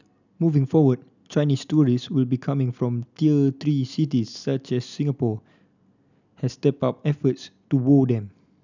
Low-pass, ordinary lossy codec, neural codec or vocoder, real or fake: 7.2 kHz; none; none; real